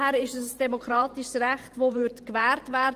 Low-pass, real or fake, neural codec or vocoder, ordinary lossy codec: 14.4 kHz; fake; vocoder, 44.1 kHz, 128 mel bands every 512 samples, BigVGAN v2; Opus, 24 kbps